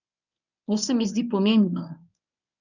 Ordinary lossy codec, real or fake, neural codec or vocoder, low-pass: none; fake; codec, 24 kHz, 0.9 kbps, WavTokenizer, medium speech release version 1; 7.2 kHz